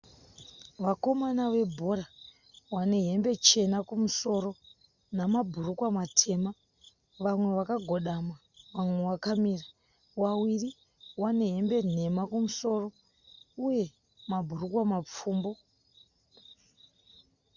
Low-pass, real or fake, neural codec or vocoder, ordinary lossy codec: 7.2 kHz; real; none; Opus, 64 kbps